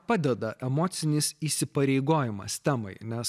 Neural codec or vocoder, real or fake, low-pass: none; real; 14.4 kHz